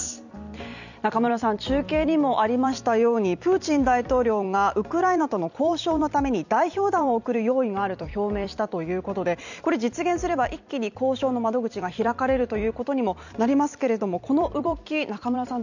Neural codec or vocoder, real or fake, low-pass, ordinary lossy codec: vocoder, 44.1 kHz, 128 mel bands every 256 samples, BigVGAN v2; fake; 7.2 kHz; none